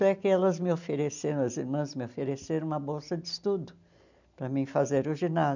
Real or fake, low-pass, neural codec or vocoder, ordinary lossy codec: real; 7.2 kHz; none; none